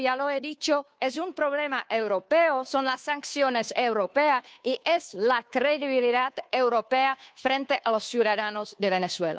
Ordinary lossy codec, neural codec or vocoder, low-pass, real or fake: none; codec, 16 kHz, 2 kbps, FunCodec, trained on Chinese and English, 25 frames a second; none; fake